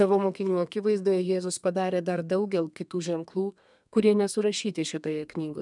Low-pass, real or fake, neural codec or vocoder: 10.8 kHz; fake; codec, 32 kHz, 1.9 kbps, SNAC